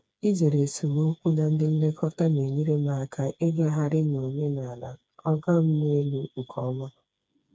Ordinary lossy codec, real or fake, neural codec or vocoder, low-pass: none; fake; codec, 16 kHz, 4 kbps, FreqCodec, smaller model; none